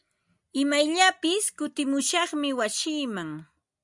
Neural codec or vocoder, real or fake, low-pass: none; real; 10.8 kHz